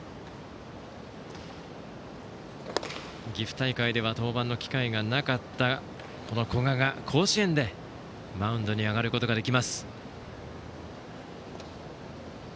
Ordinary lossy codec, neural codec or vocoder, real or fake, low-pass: none; none; real; none